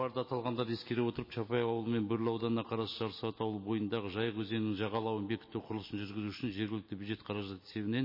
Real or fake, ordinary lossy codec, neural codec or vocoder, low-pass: real; MP3, 24 kbps; none; 5.4 kHz